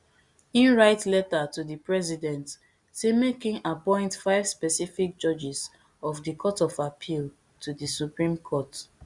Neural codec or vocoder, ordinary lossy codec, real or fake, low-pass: none; Opus, 64 kbps; real; 10.8 kHz